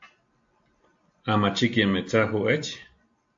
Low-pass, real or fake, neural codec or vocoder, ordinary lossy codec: 7.2 kHz; real; none; AAC, 48 kbps